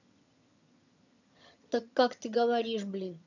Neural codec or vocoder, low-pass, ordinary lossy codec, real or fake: vocoder, 22.05 kHz, 80 mel bands, HiFi-GAN; 7.2 kHz; none; fake